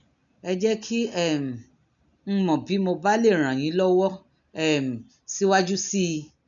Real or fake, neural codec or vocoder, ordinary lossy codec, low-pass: real; none; none; 7.2 kHz